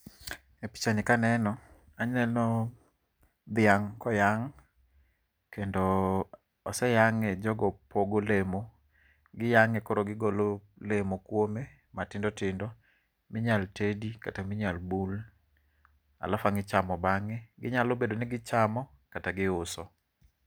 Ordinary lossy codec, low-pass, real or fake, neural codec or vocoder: none; none; real; none